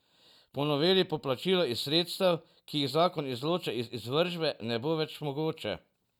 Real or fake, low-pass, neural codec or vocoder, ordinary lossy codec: real; 19.8 kHz; none; none